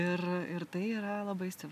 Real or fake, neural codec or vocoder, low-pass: real; none; 14.4 kHz